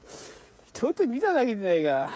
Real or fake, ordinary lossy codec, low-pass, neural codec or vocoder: fake; none; none; codec, 16 kHz, 8 kbps, FreqCodec, smaller model